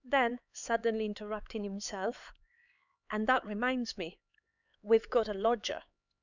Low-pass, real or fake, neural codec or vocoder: 7.2 kHz; fake; codec, 16 kHz, 4 kbps, X-Codec, HuBERT features, trained on LibriSpeech